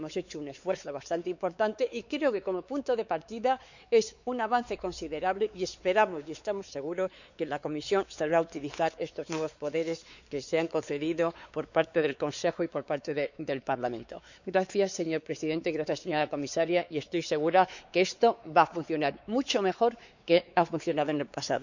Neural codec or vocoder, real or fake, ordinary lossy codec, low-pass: codec, 16 kHz, 4 kbps, X-Codec, WavLM features, trained on Multilingual LibriSpeech; fake; none; 7.2 kHz